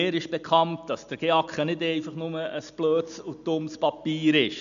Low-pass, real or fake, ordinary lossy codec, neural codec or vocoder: 7.2 kHz; real; none; none